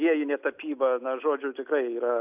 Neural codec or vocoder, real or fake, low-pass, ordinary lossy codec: none; real; 3.6 kHz; AAC, 32 kbps